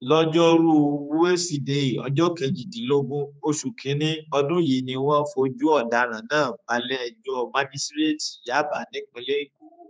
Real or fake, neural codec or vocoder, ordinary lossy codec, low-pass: fake; codec, 16 kHz, 4 kbps, X-Codec, HuBERT features, trained on general audio; none; none